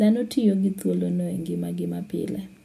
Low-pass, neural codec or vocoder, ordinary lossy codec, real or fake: 14.4 kHz; none; MP3, 64 kbps; real